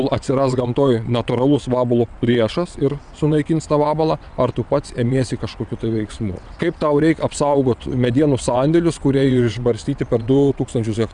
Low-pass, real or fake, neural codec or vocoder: 9.9 kHz; fake; vocoder, 22.05 kHz, 80 mel bands, WaveNeXt